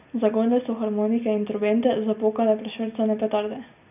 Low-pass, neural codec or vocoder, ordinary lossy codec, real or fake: 3.6 kHz; none; none; real